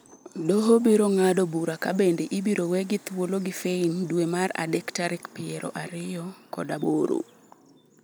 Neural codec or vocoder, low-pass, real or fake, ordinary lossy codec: none; none; real; none